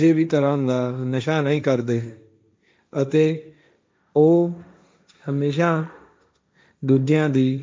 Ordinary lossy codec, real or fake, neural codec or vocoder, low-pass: none; fake; codec, 16 kHz, 1.1 kbps, Voila-Tokenizer; none